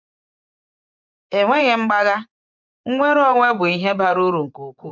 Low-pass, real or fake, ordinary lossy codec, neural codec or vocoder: 7.2 kHz; fake; none; vocoder, 24 kHz, 100 mel bands, Vocos